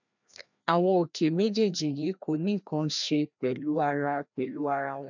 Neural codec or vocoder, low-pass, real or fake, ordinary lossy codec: codec, 16 kHz, 1 kbps, FreqCodec, larger model; 7.2 kHz; fake; none